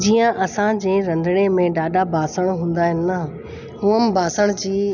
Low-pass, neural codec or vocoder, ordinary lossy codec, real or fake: 7.2 kHz; none; none; real